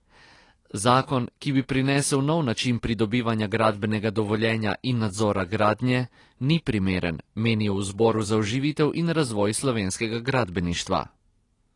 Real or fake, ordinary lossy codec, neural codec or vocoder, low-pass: real; AAC, 32 kbps; none; 10.8 kHz